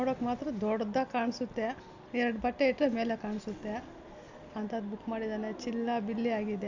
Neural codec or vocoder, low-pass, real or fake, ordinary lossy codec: none; 7.2 kHz; real; AAC, 48 kbps